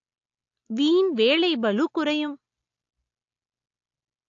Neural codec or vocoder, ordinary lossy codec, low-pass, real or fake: none; AAC, 48 kbps; 7.2 kHz; real